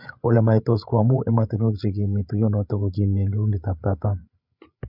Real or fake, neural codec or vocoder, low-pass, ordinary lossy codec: fake; codec, 16 kHz, 8 kbps, FreqCodec, larger model; 5.4 kHz; none